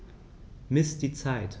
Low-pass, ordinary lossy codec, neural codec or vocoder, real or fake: none; none; none; real